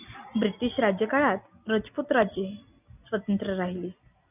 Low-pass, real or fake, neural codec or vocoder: 3.6 kHz; real; none